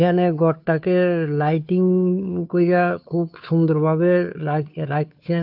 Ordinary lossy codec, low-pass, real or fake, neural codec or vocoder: none; 5.4 kHz; fake; codec, 16 kHz, 2 kbps, FunCodec, trained on Chinese and English, 25 frames a second